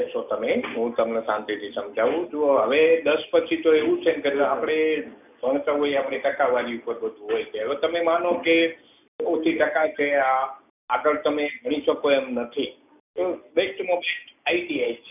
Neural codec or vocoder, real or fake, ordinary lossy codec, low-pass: none; real; none; 3.6 kHz